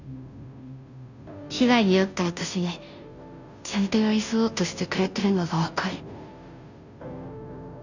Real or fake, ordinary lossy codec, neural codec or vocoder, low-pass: fake; none; codec, 16 kHz, 0.5 kbps, FunCodec, trained on Chinese and English, 25 frames a second; 7.2 kHz